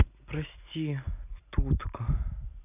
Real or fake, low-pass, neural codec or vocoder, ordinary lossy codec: real; 3.6 kHz; none; none